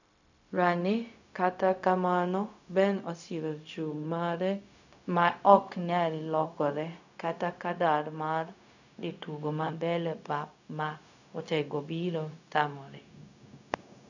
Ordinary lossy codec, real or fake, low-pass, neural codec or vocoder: none; fake; 7.2 kHz; codec, 16 kHz, 0.4 kbps, LongCat-Audio-Codec